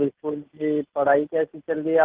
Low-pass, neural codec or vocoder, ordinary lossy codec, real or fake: 3.6 kHz; none; Opus, 16 kbps; real